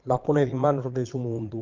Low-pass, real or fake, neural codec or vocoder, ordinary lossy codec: 7.2 kHz; fake; vocoder, 22.05 kHz, 80 mel bands, Vocos; Opus, 24 kbps